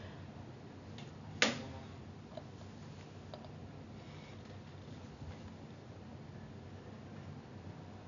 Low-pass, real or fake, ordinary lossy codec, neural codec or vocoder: 7.2 kHz; real; none; none